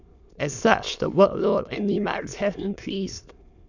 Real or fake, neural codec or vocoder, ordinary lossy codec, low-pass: fake; autoencoder, 22.05 kHz, a latent of 192 numbers a frame, VITS, trained on many speakers; none; 7.2 kHz